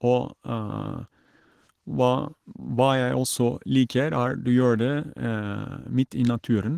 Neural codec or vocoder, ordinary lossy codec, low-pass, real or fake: none; Opus, 16 kbps; 14.4 kHz; real